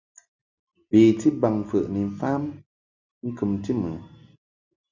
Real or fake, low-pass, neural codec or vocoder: real; 7.2 kHz; none